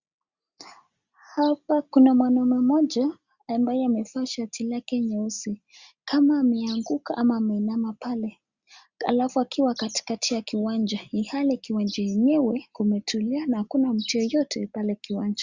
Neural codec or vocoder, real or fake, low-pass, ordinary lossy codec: none; real; 7.2 kHz; Opus, 64 kbps